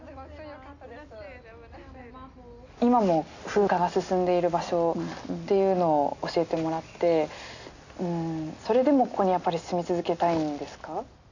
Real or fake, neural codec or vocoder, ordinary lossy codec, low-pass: real; none; none; 7.2 kHz